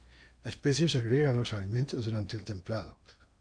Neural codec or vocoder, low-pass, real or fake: codec, 16 kHz in and 24 kHz out, 0.8 kbps, FocalCodec, streaming, 65536 codes; 9.9 kHz; fake